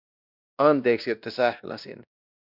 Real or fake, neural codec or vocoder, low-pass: fake; codec, 16 kHz, 1 kbps, X-Codec, WavLM features, trained on Multilingual LibriSpeech; 5.4 kHz